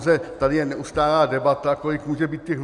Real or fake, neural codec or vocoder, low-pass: real; none; 10.8 kHz